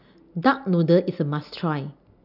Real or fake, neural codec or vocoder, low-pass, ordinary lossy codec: real; none; 5.4 kHz; none